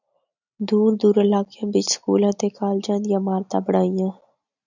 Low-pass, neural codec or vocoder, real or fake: 7.2 kHz; none; real